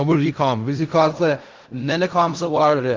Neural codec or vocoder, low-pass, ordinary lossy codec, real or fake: codec, 16 kHz in and 24 kHz out, 0.4 kbps, LongCat-Audio-Codec, fine tuned four codebook decoder; 7.2 kHz; Opus, 32 kbps; fake